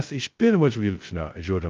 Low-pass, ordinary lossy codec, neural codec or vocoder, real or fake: 7.2 kHz; Opus, 32 kbps; codec, 16 kHz, 0.2 kbps, FocalCodec; fake